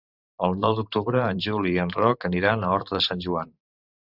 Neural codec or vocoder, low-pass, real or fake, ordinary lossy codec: vocoder, 44.1 kHz, 128 mel bands every 512 samples, BigVGAN v2; 5.4 kHz; fake; Opus, 64 kbps